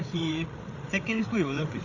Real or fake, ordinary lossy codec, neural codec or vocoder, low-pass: fake; none; codec, 16 kHz, 8 kbps, FreqCodec, larger model; 7.2 kHz